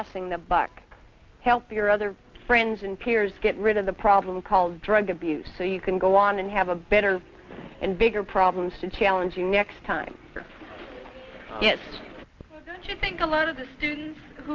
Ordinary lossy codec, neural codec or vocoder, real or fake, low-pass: Opus, 24 kbps; none; real; 7.2 kHz